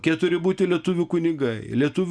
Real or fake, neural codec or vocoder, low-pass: real; none; 9.9 kHz